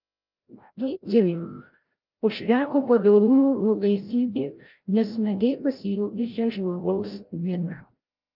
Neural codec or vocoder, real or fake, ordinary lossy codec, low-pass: codec, 16 kHz, 0.5 kbps, FreqCodec, larger model; fake; Opus, 32 kbps; 5.4 kHz